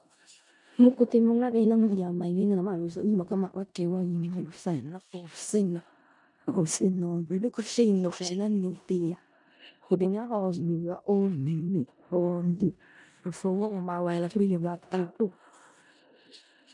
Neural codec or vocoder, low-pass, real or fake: codec, 16 kHz in and 24 kHz out, 0.4 kbps, LongCat-Audio-Codec, four codebook decoder; 10.8 kHz; fake